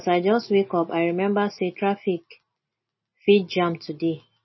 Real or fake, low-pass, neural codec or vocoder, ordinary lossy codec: real; 7.2 kHz; none; MP3, 24 kbps